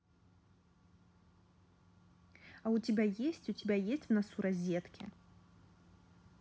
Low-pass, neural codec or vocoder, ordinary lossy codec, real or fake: none; none; none; real